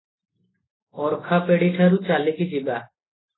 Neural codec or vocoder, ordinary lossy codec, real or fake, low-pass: none; AAC, 16 kbps; real; 7.2 kHz